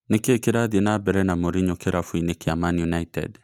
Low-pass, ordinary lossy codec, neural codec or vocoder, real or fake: 19.8 kHz; none; none; real